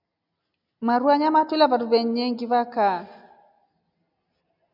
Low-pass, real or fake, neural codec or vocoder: 5.4 kHz; real; none